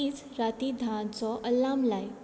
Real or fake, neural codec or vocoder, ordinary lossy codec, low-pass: real; none; none; none